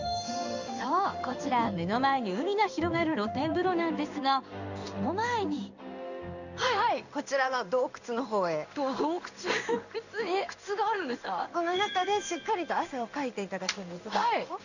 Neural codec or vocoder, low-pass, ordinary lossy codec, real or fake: codec, 16 kHz in and 24 kHz out, 1 kbps, XY-Tokenizer; 7.2 kHz; none; fake